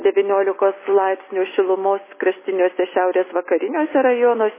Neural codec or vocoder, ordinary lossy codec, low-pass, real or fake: none; MP3, 16 kbps; 3.6 kHz; real